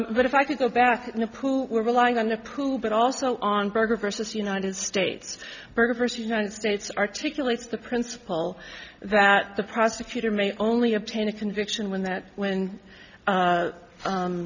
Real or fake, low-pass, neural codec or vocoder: real; 7.2 kHz; none